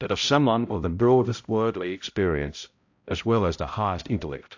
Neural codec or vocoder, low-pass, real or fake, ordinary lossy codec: codec, 16 kHz, 0.5 kbps, X-Codec, HuBERT features, trained on balanced general audio; 7.2 kHz; fake; AAC, 48 kbps